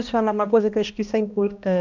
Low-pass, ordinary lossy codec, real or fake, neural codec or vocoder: 7.2 kHz; none; fake; codec, 16 kHz, 1 kbps, X-Codec, HuBERT features, trained on balanced general audio